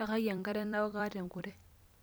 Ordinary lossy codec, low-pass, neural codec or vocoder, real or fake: none; none; vocoder, 44.1 kHz, 128 mel bands every 256 samples, BigVGAN v2; fake